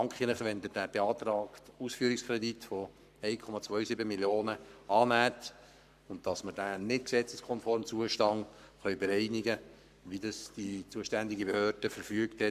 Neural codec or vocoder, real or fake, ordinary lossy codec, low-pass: codec, 44.1 kHz, 7.8 kbps, Pupu-Codec; fake; none; 14.4 kHz